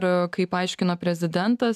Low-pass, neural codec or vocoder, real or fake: 14.4 kHz; none; real